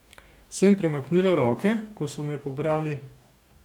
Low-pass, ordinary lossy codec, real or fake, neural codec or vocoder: 19.8 kHz; none; fake; codec, 44.1 kHz, 2.6 kbps, DAC